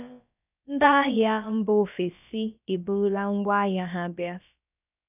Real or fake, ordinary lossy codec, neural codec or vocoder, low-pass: fake; none; codec, 16 kHz, about 1 kbps, DyCAST, with the encoder's durations; 3.6 kHz